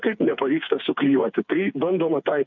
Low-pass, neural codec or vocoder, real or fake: 7.2 kHz; codec, 16 kHz, 4 kbps, FreqCodec, smaller model; fake